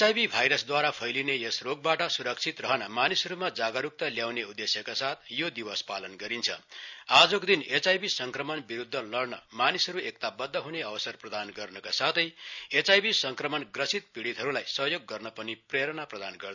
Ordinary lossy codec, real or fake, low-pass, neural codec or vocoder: none; real; 7.2 kHz; none